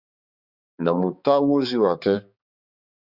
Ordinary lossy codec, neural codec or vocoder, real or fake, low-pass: Opus, 64 kbps; codec, 16 kHz, 4 kbps, X-Codec, HuBERT features, trained on balanced general audio; fake; 5.4 kHz